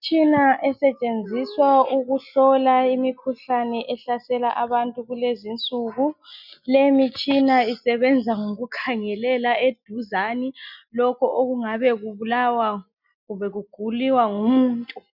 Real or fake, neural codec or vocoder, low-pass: real; none; 5.4 kHz